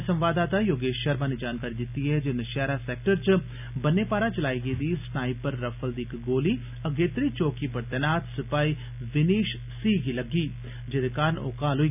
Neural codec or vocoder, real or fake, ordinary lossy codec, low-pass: none; real; none; 3.6 kHz